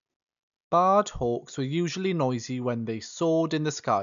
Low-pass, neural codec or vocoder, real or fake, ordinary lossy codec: 7.2 kHz; none; real; none